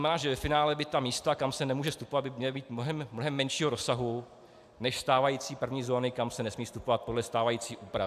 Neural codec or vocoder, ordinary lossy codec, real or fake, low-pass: none; AAC, 96 kbps; real; 14.4 kHz